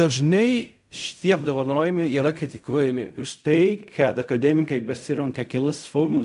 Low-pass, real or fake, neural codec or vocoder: 10.8 kHz; fake; codec, 16 kHz in and 24 kHz out, 0.4 kbps, LongCat-Audio-Codec, fine tuned four codebook decoder